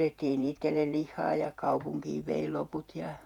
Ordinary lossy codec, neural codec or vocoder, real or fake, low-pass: none; vocoder, 48 kHz, 128 mel bands, Vocos; fake; 19.8 kHz